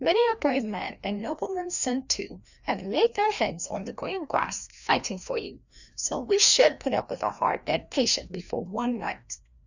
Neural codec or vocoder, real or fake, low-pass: codec, 16 kHz, 1 kbps, FreqCodec, larger model; fake; 7.2 kHz